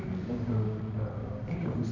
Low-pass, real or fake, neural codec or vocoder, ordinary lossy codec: 7.2 kHz; fake; codec, 24 kHz, 0.9 kbps, WavTokenizer, medium music audio release; none